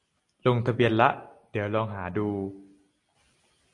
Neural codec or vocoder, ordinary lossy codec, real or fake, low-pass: none; AAC, 48 kbps; real; 10.8 kHz